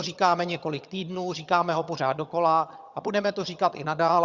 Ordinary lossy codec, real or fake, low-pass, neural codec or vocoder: Opus, 64 kbps; fake; 7.2 kHz; vocoder, 22.05 kHz, 80 mel bands, HiFi-GAN